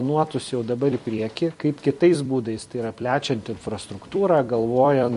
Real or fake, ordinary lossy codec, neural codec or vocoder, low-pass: fake; MP3, 48 kbps; vocoder, 44.1 kHz, 128 mel bands every 256 samples, BigVGAN v2; 14.4 kHz